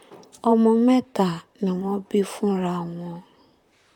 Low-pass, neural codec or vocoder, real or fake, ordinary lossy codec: 19.8 kHz; vocoder, 44.1 kHz, 128 mel bands, Pupu-Vocoder; fake; none